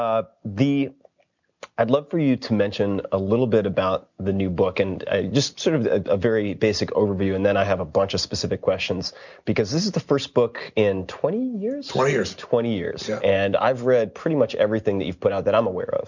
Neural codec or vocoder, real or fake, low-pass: none; real; 7.2 kHz